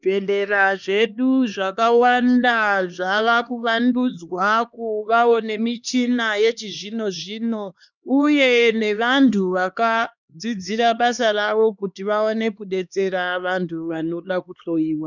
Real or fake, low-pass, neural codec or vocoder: fake; 7.2 kHz; codec, 16 kHz, 2 kbps, X-Codec, HuBERT features, trained on LibriSpeech